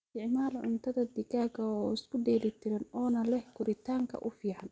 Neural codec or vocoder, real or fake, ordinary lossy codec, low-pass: none; real; none; none